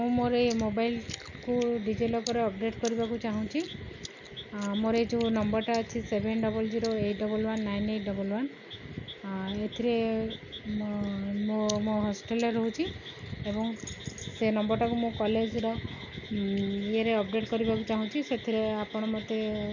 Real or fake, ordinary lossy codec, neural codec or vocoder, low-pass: real; none; none; 7.2 kHz